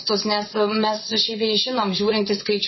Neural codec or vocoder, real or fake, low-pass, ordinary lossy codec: vocoder, 22.05 kHz, 80 mel bands, Vocos; fake; 7.2 kHz; MP3, 24 kbps